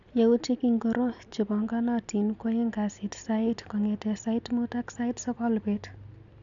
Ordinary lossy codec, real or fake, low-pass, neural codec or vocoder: none; real; 7.2 kHz; none